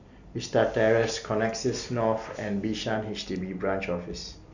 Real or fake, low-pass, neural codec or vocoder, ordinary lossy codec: real; 7.2 kHz; none; none